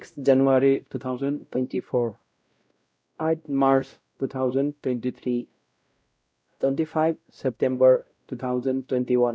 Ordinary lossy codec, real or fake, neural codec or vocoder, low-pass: none; fake; codec, 16 kHz, 0.5 kbps, X-Codec, WavLM features, trained on Multilingual LibriSpeech; none